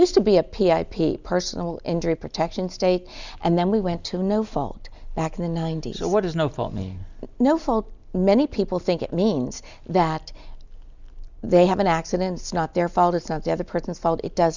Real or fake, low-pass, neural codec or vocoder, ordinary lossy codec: real; 7.2 kHz; none; Opus, 64 kbps